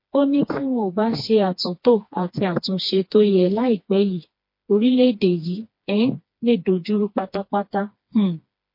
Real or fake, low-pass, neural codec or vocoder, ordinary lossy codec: fake; 5.4 kHz; codec, 16 kHz, 2 kbps, FreqCodec, smaller model; MP3, 32 kbps